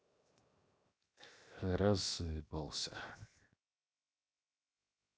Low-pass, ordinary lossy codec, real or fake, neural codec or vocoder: none; none; fake; codec, 16 kHz, 0.7 kbps, FocalCodec